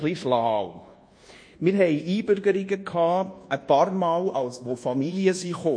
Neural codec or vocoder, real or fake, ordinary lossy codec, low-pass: codec, 24 kHz, 1.2 kbps, DualCodec; fake; MP3, 48 kbps; 9.9 kHz